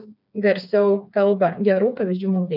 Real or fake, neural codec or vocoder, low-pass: fake; codec, 16 kHz, 4 kbps, FreqCodec, smaller model; 5.4 kHz